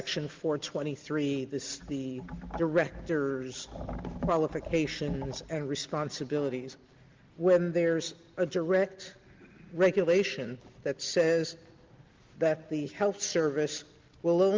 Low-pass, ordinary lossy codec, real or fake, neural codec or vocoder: 7.2 kHz; Opus, 32 kbps; real; none